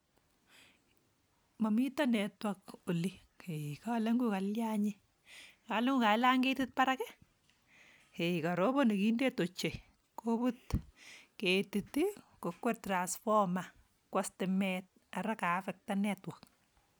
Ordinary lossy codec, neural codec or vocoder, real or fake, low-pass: none; none; real; none